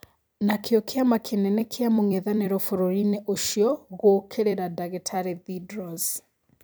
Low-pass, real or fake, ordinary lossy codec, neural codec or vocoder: none; fake; none; vocoder, 44.1 kHz, 128 mel bands every 256 samples, BigVGAN v2